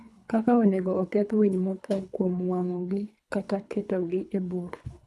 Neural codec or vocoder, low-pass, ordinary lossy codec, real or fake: codec, 24 kHz, 3 kbps, HILCodec; none; none; fake